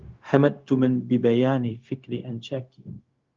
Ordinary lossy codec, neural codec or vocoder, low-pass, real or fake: Opus, 32 kbps; codec, 16 kHz, 0.4 kbps, LongCat-Audio-Codec; 7.2 kHz; fake